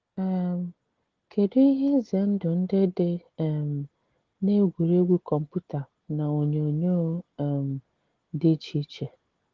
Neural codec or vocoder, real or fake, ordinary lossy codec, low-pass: none; real; Opus, 16 kbps; 7.2 kHz